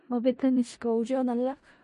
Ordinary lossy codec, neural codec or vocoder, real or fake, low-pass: MP3, 48 kbps; codec, 16 kHz in and 24 kHz out, 0.4 kbps, LongCat-Audio-Codec, four codebook decoder; fake; 10.8 kHz